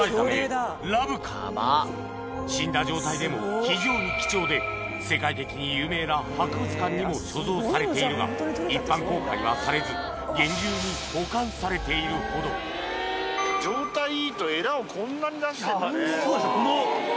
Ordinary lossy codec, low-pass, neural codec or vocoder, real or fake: none; none; none; real